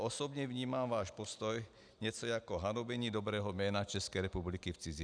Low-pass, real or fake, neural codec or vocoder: 9.9 kHz; real; none